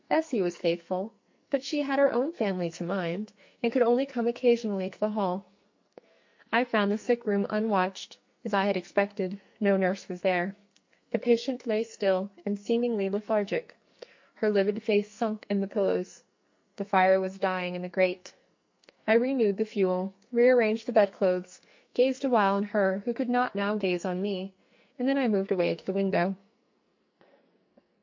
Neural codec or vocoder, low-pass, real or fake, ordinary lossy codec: codec, 44.1 kHz, 2.6 kbps, SNAC; 7.2 kHz; fake; MP3, 48 kbps